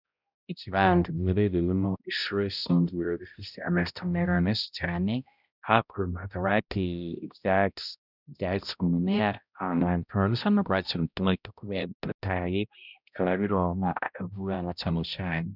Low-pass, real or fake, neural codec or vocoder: 5.4 kHz; fake; codec, 16 kHz, 0.5 kbps, X-Codec, HuBERT features, trained on balanced general audio